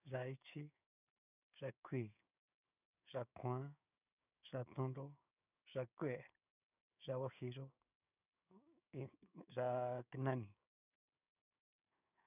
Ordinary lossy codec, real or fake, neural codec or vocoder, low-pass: none; fake; codec, 16 kHz, 6 kbps, DAC; 3.6 kHz